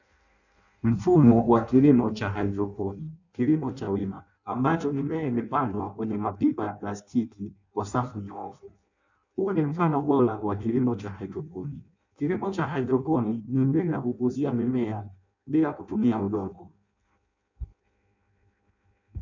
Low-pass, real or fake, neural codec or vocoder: 7.2 kHz; fake; codec, 16 kHz in and 24 kHz out, 0.6 kbps, FireRedTTS-2 codec